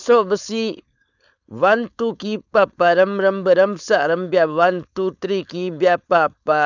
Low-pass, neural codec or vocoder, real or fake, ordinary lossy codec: 7.2 kHz; codec, 16 kHz, 4.8 kbps, FACodec; fake; none